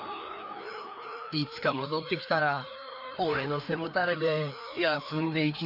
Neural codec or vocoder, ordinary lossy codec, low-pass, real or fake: codec, 16 kHz, 2 kbps, FreqCodec, larger model; none; 5.4 kHz; fake